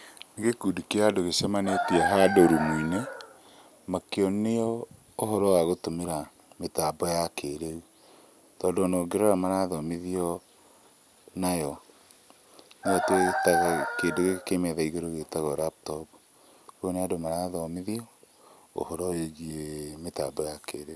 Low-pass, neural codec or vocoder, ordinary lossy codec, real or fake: none; none; none; real